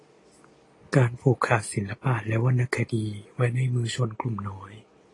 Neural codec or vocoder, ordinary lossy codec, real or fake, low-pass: none; AAC, 32 kbps; real; 10.8 kHz